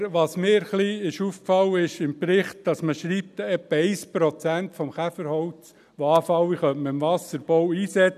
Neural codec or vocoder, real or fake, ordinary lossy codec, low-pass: none; real; none; 14.4 kHz